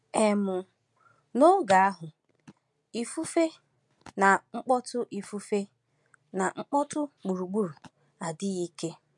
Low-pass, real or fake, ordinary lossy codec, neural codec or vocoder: 10.8 kHz; real; MP3, 64 kbps; none